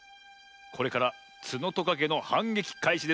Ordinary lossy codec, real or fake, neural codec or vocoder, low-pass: none; real; none; none